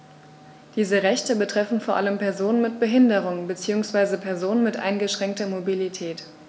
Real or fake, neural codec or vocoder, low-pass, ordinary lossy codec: real; none; none; none